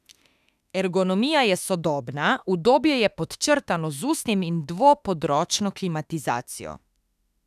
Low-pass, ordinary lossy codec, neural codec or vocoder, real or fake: 14.4 kHz; none; autoencoder, 48 kHz, 32 numbers a frame, DAC-VAE, trained on Japanese speech; fake